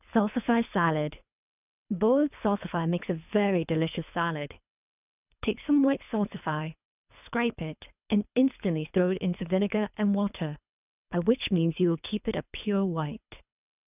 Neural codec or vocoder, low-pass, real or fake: codec, 24 kHz, 3 kbps, HILCodec; 3.6 kHz; fake